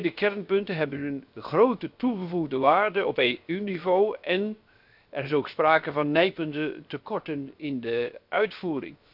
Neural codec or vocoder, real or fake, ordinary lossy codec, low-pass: codec, 16 kHz, 0.7 kbps, FocalCodec; fake; none; 5.4 kHz